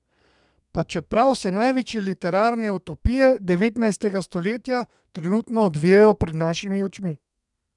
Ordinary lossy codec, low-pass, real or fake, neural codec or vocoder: MP3, 96 kbps; 10.8 kHz; fake; codec, 32 kHz, 1.9 kbps, SNAC